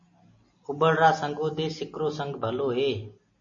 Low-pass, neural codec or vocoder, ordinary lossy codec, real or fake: 7.2 kHz; none; MP3, 32 kbps; real